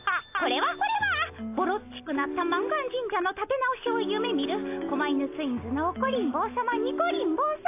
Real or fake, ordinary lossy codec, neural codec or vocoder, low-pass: real; AAC, 24 kbps; none; 3.6 kHz